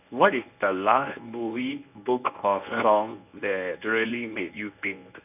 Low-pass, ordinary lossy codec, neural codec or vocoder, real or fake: 3.6 kHz; none; codec, 24 kHz, 0.9 kbps, WavTokenizer, medium speech release version 1; fake